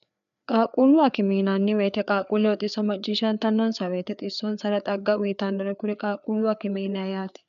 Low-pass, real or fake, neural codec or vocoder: 7.2 kHz; fake; codec, 16 kHz, 4 kbps, FreqCodec, larger model